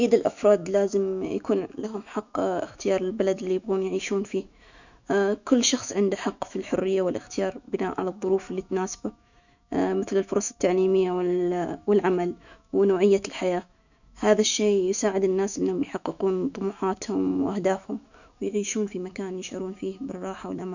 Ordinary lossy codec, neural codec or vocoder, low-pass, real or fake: none; autoencoder, 48 kHz, 128 numbers a frame, DAC-VAE, trained on Japanese speech; 7.2 kHz; fake